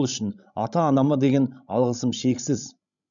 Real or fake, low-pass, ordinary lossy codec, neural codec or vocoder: fake; 7.2 kHz; none; codec, 16 kHz, 16 kbps, FreqCodec, larger model